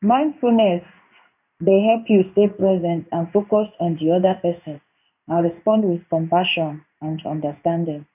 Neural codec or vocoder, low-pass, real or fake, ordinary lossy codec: codec, 16 kHz in and 24 kHz out, 1 kbps, XY-Tokenizer; 3.6 kHz; fake; none